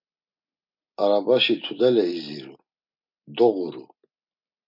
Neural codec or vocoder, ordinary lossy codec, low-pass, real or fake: none; MP3, 48 kbps; 5.4 kHz; real